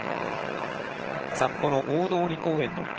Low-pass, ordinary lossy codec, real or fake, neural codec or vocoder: 7.2 kHz; Opus, 16 kbps; fake; vocoder, 22.05 kHz, 80 mel bands, HiFi-GAN